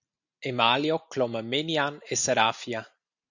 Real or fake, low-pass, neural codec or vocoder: real; 7.2 kHz; none